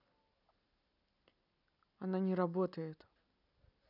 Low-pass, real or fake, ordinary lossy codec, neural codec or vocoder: 5.4 kHz; real; none; none